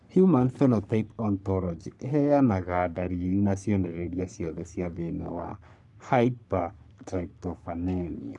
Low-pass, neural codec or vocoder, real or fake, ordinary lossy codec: 10.8 kHz; codec, 44.1 kHz, 3.4 kbps, Pupu-Codec; fake; none